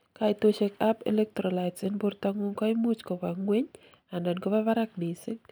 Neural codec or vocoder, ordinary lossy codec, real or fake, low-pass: none; none; real; none